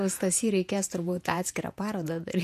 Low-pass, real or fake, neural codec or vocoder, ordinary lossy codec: 14.4 kHz; fake; vocoder, 48 kHz, 128 mel bands, Vocos; MP3, 64 kbps